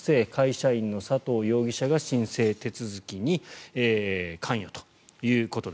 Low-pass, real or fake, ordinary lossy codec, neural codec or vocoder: none; real; none; none